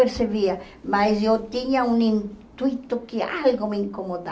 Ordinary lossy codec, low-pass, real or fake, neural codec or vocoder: none; none; real; none